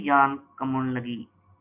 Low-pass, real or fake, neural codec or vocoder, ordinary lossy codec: 3.6 kHz; real; none; MP3, 32 kbps